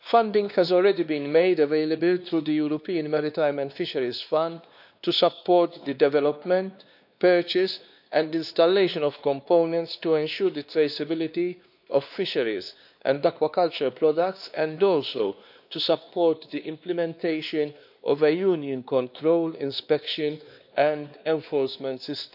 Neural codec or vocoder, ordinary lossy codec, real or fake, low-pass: codec, 16 kHz, 2 kbps, X-Codec, WavLM features, trained on Multilingual LibriSpeech; none; fake; 5.4 kHz